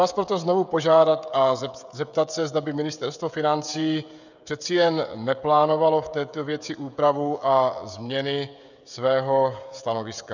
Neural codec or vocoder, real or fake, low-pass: codec, 16 kHz, 16 kbps, FreqCodec, smaller model; fake; 7.2 kHz